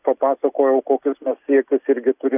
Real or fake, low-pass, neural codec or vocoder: real; 3.6 kHz; none